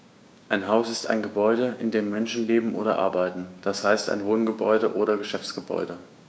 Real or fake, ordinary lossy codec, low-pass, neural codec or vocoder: fake; none; none; codec, 16 kHz, 6 kbps, DAC